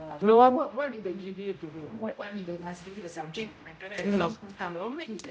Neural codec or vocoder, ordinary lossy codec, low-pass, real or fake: codec, 16 kHz, 0.5 kbps, X-Codec, HuBERT features, trained on general audio; none; none; fake